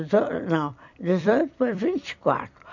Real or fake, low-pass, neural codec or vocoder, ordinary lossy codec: fake; 7.2 kHz; vocoder, 44.1 kHz, 128 mel bands every 256 samples, BigVGAN v2; none